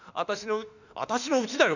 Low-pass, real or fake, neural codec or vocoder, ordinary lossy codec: 7.2 kHz; fake; autoencoder, 48 kHz, 32 numbers a frame, DAC-VAE, trained on Japanese speech; none